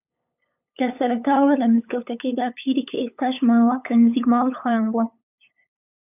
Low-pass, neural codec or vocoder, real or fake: 3.6 kHz; codec, 16 kHz, 8 kbps, FunCodec, trained on LibriTTS, 25 frames a second; fake